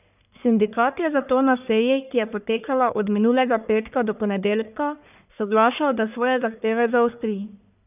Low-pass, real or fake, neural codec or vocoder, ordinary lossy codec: 3.6 kHz; fake; codec, 44.1 kHz, 1.7 kbps, Pupu-Codec; none